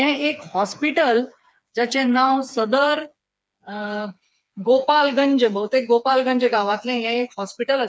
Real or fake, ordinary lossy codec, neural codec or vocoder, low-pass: fake; none; codec, 16 kHz, 4 kbps, FreqCodec, smaller model; none